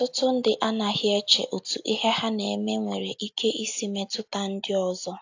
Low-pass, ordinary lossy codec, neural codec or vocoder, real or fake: 7.2 kHz; AAC, 48 kbps; none; real